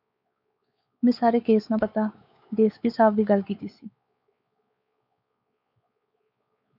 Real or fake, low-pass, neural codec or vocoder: fake; 5.4 kHz; codec, 16 kHz, 4 kbps, X-Codec, WavLM features, trained on Multilingual LibriSpeech